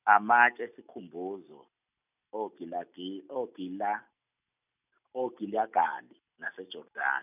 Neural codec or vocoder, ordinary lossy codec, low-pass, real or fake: none; none; 3.6 kHz; real